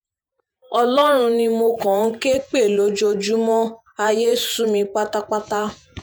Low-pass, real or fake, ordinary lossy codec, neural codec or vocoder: none; fake; none; vocoder, 48 kHz, 128 mel bands, Vocos